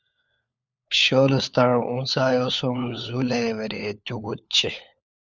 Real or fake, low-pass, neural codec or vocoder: fake; 7.2 kHz; codec, 16 kHz, 4 kbps, FunCodec, trained on LibriTTS, 50 frames a second